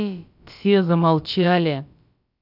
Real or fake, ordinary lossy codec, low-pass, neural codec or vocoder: fake; none; 5.4 kHz; codec, 16 kHz, about 1 kbps, DyCAST, with the encoder's durations